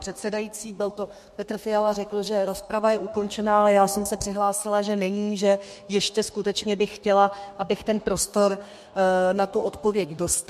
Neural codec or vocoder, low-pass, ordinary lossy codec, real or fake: codec, 32 kHz, 1.9 kbps, SNAC; 14.4 kHz; MP3, 64 kbps; fake